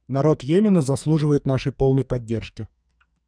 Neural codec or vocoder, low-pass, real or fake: codec, 44.1 kHz, 2.6 kbps, SNAC; 9.9 kHz; fake